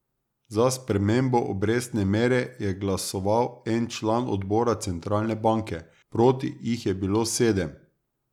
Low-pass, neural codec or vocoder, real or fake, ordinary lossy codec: 19.8 kHz; none; real; none